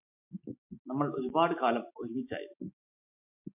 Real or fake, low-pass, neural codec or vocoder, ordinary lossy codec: real; 3.6 kHz; none; MP3, 32 kbps